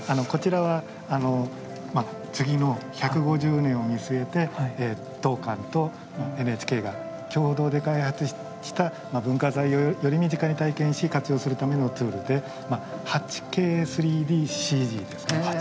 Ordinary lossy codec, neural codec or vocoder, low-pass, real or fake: none; none; none; real